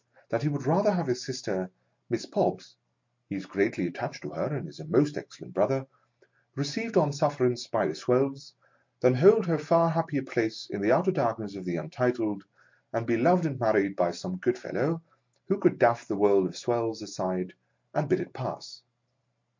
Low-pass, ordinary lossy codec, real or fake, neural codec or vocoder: 7.2 kHz; MP3, 48 kbps; real; none